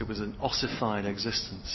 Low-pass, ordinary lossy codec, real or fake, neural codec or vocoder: 7.2 kHz; MP3, 24 kbps; real; none